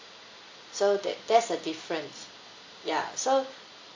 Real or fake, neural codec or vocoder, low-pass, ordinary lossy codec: real; none; 7.2 kHz; AAC, 48 kbps